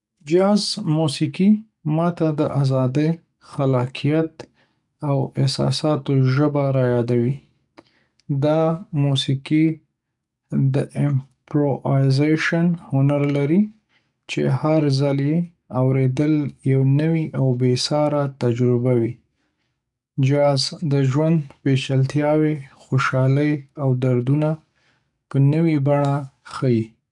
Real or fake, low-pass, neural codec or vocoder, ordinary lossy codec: fake; 10.8 kHz; codec, 44.1 kHz, 7.8 kbps, DAC; none